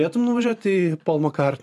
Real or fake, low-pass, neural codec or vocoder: fake; 14.4 kHz; vocoder, 44.1 kHz, 128 mel bands every 512 samples, BigVGAN v2